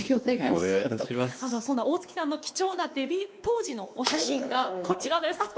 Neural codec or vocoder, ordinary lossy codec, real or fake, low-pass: codec, 16 kHz, 2 kbps, X-Codec, WavLM features, trained on Multilingual LibriSpeech; none; fake; none